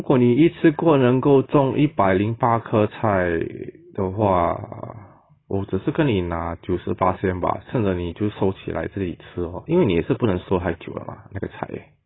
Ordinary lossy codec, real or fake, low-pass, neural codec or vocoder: AAC, 16 kbps; real; 7.2 kHz; none